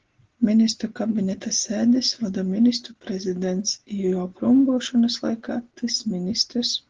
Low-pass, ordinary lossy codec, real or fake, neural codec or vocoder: 7.2 kHz; Opus, 16 kbps; real; none